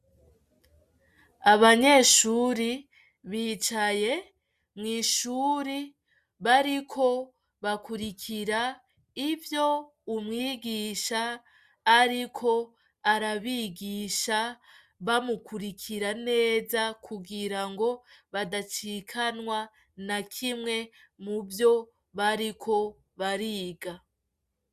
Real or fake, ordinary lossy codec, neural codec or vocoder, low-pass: real; Opus, 64 kbps; none; 14.4 kHz